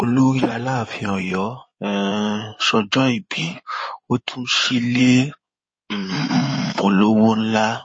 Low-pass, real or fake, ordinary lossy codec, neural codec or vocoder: 9.9 kHz; fake; MP3, 32 kbps; codec, 16 kHz in and 24 kHz out, 2.2 kbps, FireRedTTS-2 codec